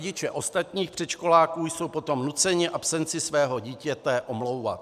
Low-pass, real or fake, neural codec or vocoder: 14.4 kHz; real; none